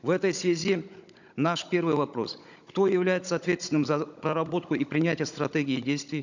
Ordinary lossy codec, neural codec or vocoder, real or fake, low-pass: none; codec, 16 kHz, 16 kbps, FunCodec, trained on Chinese and English, 50 frames a second; fake; 7.2 kHz